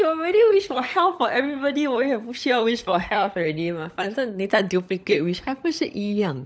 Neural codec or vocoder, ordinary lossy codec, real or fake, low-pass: codec, 16 kHz, 16 kbps, FunCodec, trained on LibriTTS, 50 frames a second; none; fake; none